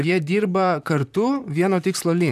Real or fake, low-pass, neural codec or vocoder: fake; 14.4 kHz; vocoder, 44.1 kHz, 128 mel bands, Pupu-Vocoder